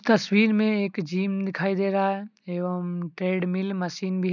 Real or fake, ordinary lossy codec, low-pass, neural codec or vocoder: real; none; 7.2 kHz; none